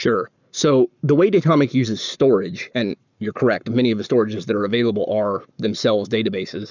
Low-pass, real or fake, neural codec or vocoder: 7.2 kHz; fake; codec, 44.1 kHz, 7.8 kbps, Pupu-Codec